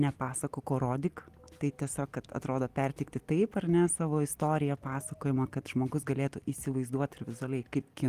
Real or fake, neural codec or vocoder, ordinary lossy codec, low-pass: real; none; Opus, 24 kbps; 14.4 kHz